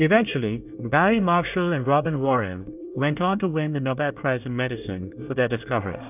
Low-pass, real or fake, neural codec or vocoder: 3.6 kHz; fake; codec, 24 kHz, 1 kbps, SNAC